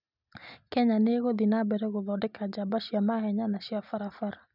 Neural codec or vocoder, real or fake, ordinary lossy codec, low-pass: none; real; none; 5.4 kHz